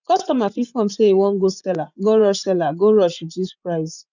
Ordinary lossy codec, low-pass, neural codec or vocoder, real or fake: none; 7.2 kHz; vocoder, 24 kHz, 100 mel bands, Vocos; fake